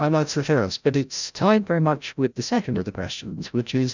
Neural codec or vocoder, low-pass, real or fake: codec, 16 kHz, 0.5 kbps, FreqCodec, larger model; 7.2 kHz; fake